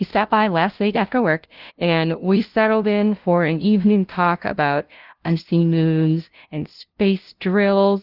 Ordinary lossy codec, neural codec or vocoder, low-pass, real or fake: Opus, 16 kbps; codec, 16 kHz, 0.5 kbps, FunCodec, trained on LibriTTS, 25 frames a second; 5.4 kHz; fake